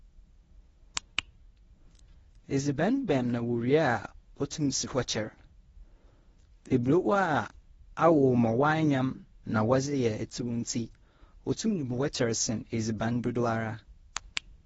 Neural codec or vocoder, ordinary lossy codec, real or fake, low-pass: codec, 24 kHz, 0.9 kbps, WavTokenizer, medium speech release version 1; AAC, 24 kbps; fake; 10.8 kHz